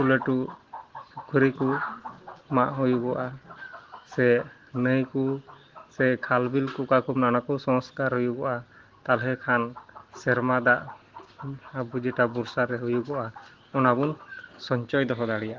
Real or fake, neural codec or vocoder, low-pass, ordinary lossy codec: real; none; 7.2 kHz; Opus, 16 kbps